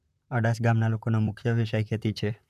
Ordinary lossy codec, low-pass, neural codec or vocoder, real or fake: none; 14.4 kHz; vocoder, 44.1 kHz, 128 mel bands, Pupu-Vocoder; fake